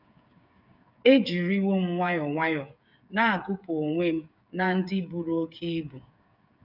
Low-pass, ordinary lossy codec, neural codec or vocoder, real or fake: 5.4 kHz; none; codec, 16 kHz, 8 kbps, FreqCodec, smaller model; fake